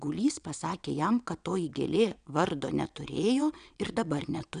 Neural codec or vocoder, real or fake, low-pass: vocoder, 22.05 kHz, 80 mel bands, WaveNeXt; fake; 9.9 kHz